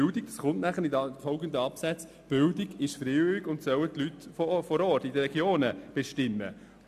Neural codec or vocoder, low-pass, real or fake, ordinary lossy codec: none; 14.4 kHz; real; AAC, 96 kbps